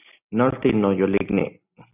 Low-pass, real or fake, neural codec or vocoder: 3.6 kHz; real; none